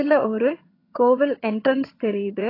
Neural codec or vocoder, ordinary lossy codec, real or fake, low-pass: vocoder, 22.05 kHz, 80 mel bands, HiFi-GAN; AAC, 24 kbps; fake; 5.4 kHz